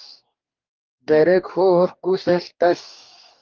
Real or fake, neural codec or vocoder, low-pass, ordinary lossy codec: fake; codec, 44.1 kHz, 2.6 kbps, DAC; 7.2 kHz; Opus, 32 kbps